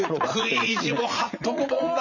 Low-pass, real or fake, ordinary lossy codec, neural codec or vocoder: 7.2 kHz; fake; none; vocoder, 44.1 kHz, 80 mel bands, Vocos